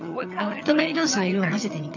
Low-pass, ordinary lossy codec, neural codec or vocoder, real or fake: 7.2 kHz; none; vocoder, 22.05 kHz, 80 mel bands, HiFi-GAN; fake